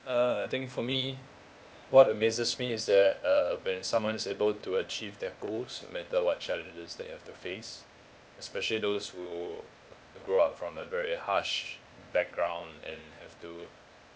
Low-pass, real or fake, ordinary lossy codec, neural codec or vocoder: none; fake; none; codec, 16 kHz, 0.8 kbps, ZipCodec